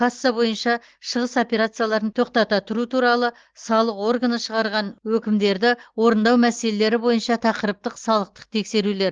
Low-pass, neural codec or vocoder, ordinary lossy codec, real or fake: 7.2 kHz; none; Opus, 16 kbps; real